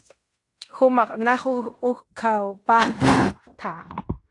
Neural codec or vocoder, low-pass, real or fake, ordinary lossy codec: codec, 16 kHz in and 24 kHz out, 0.9 kbps, LongCat-Audio-Codec, fine tuned four codebook decoder; 10.8 kHz; fake; AAC, 48 kbps